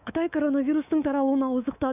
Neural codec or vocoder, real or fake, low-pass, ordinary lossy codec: codec, 24 kHz, 3.1 kbps, DualCodec; fake; 3.6 kHz; none